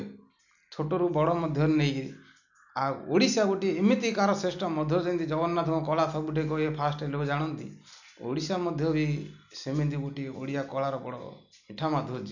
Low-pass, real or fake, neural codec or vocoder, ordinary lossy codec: 7.2 kHz; real; none; none